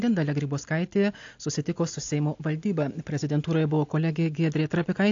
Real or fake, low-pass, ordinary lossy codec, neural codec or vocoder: real; 7.2 kHz; AAC, 48 kbps; none